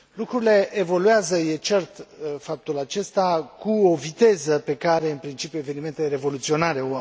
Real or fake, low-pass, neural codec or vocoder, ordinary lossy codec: real; none; none; none